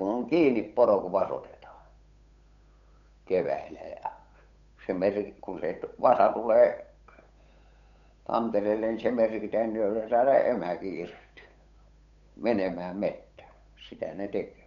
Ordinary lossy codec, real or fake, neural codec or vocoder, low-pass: none; fake; codec, 16 kHz, 8 kbps, FunCodec, trained on Chinese and English, 25 frames a second; 7.2 kHz